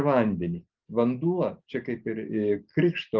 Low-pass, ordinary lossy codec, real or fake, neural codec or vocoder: 7.2 kHz; Opus, 24 kbps; real; none